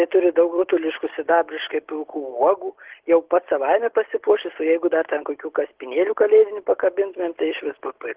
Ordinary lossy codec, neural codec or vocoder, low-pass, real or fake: Opus, 16 kbps; none; 3.6 kHz; real